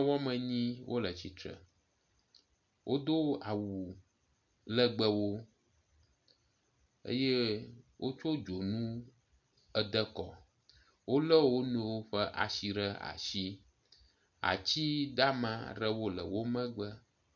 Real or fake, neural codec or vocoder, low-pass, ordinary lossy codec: real; none; 7.2 kHz; MP3, 64 kbps